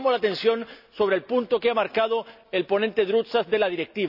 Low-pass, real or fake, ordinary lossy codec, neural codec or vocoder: 5.4 kHz; real; none; none